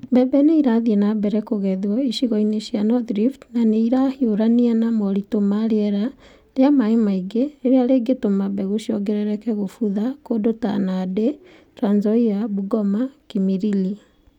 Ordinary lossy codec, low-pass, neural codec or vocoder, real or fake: none; 19.8 kHz; none; real